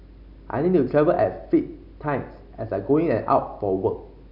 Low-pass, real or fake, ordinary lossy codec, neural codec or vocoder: 5.4 kHz; real; none; none